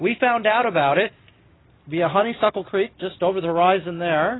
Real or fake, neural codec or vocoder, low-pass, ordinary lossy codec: real; none; 7.2 kHz; AAC, 16 kbps